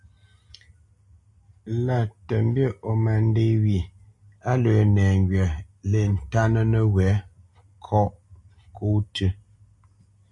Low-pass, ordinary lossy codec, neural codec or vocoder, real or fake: 10.8 kHz; MP3, 48 kbps; none; real